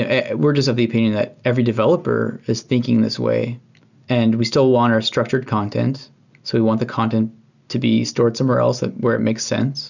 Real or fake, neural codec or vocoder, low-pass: real; none; 7.2 kHz